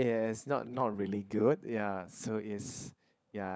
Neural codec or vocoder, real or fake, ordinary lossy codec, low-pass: codec, 16 kHz, 16 kbps, FunCodec, trained on LibriTTS, 50 frames a second; fake; none; none